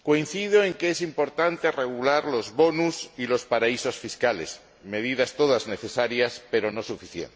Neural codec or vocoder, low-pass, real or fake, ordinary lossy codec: none; none; real; none